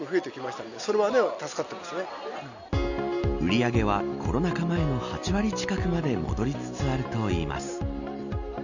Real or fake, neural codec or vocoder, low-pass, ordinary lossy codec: real; none; 7.2 kHz; none